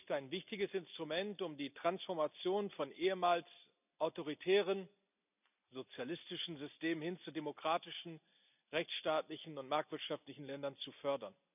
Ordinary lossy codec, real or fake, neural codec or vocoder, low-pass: none; real; none; 3.6 kHz